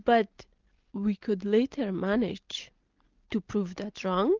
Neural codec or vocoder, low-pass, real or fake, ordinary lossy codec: none; 7.2 kHz; real; Opus, 24 kbps